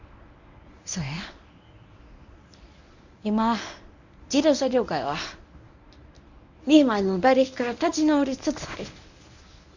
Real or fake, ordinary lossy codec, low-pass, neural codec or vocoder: fake; none; 7.2 kHz; codec, 24 kHz, 0.9 kbps, WavTokenizer, medium speech release version 1